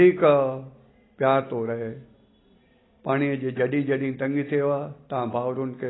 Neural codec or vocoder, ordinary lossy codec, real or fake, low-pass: none; AAC, 16 kbps; real; 7.2 kHz